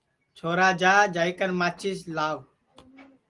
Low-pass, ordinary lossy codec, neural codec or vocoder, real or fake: 10.8 kHz; Opus, 24 kbps; none; real